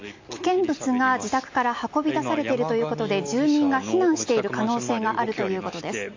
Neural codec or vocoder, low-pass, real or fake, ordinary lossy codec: none; 7.2 kHz; real; AAC, 48 kbps